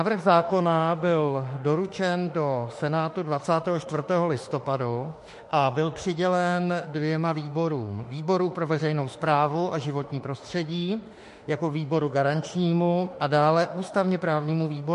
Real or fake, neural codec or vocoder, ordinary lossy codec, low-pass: fake; autoencoder, 48 kHz, 32 numbers a frame, DAC-VAE, trained on Japanese speech; MP3, 48 kbps; 14.4 kHz